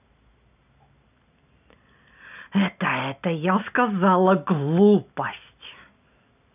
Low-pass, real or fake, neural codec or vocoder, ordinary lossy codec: 3.6 kHz; real; none; none